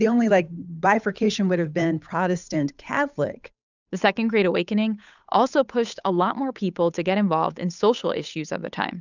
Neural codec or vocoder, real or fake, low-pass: codec, 16 kHz, 8 kbps, FunCodec, trained on Chinese and English, 25 frames a second; fake; 7.2 kHz